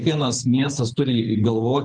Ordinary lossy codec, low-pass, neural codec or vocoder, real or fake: Opus, 32 kbps; 9.9 kHz; codec, 24 kHz, 6 kbps, HILCodec; fake